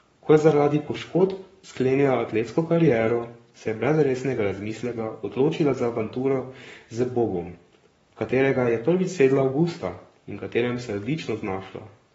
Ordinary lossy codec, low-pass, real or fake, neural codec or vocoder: AAC, 24 kbps; 19.8 kHz; fake; codec, 44.1 kHz, 7.8 kbps, Pupu-Codec